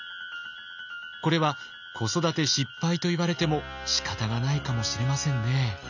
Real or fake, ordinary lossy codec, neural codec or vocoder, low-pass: real; none; none; 7.2 kHz